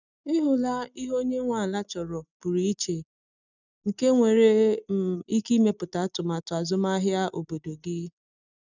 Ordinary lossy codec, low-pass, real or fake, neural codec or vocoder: none; 7.2 kHz; real; none